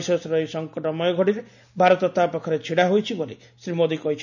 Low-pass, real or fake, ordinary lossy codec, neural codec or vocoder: 7.2 kHz; real; none; none